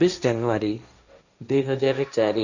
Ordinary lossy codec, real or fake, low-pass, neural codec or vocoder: none; fake; 7.2 kHz; codec, 16 kHz, 1.1 kbps, Voila-Tokenizer